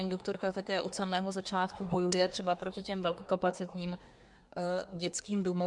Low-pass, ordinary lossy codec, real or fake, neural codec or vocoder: 10.8 kHz; MP3, 64 kbps; fake; codec, 24 kHz, 1 kbps, SNAC